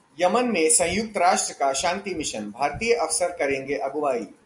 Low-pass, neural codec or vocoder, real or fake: 10.8 kHz; none; real